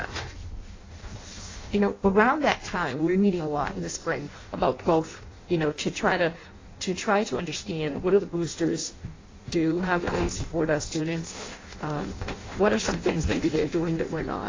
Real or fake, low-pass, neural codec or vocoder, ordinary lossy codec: fake; 7.2 kHz; codec, 16 kHz in and 24 kHz out, 0.6 kbps, FireRedTTS-2 codec; AAC, 32 kbps